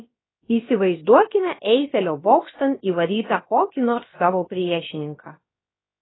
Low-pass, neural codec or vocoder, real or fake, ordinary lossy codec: 7.2 kHz; codec, 16 kHz, about 1 kbps, DyCAST, with the encoder's durations; fake; AAC, 16 kbps